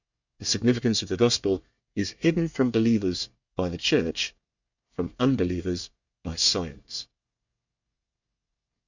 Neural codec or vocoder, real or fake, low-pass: codec, 24 kHz, 1 kbps, SNAC; fake; 7.2 kHz